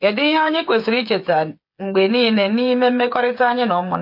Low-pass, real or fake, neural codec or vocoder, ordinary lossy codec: 5.4 kHz; fake; codec, 16 kHz, 8 kbps, FreqCodec, smaller model; MP3, 32 kbps